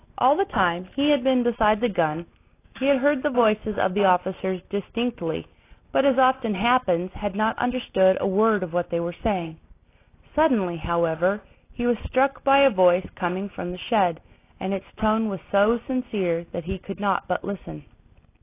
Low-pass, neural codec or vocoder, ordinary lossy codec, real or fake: 3.6 kHz; none; AAC, 24 kbps; real